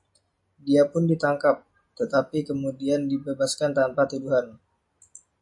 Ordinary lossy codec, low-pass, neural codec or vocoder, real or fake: MP3, 48 kbps; 10.8 kHz; none; real